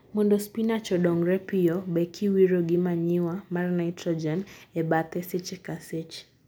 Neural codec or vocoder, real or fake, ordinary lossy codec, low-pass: none; real; none; none